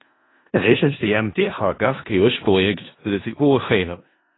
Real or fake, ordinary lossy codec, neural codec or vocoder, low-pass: fake; AAC, 16 kbps; codec, 16 kHz in and 24 kHz out, 0.4 kbps, LongCat-Audio-Codec, four codebook decoder; 7.2 kHz